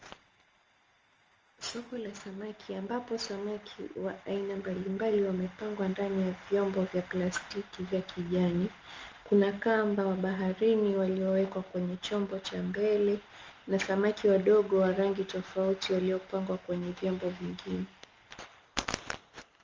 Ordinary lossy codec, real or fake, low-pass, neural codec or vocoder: Opus, 24 kbps; real; 7.2 kHz; none